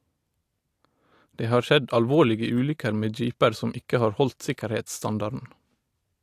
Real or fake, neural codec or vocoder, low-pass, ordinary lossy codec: real; none; 14.4 kHz; AAC, 64 kbps